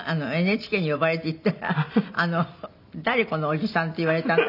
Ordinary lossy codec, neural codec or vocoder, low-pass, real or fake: none; none; 5.4 kHz; real